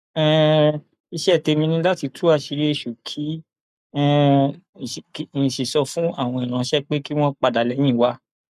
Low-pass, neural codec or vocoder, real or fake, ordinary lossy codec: 14.4 kHz; codec, 44.1 kHz, 7.8 kbps, Pupu-Codec; fake; none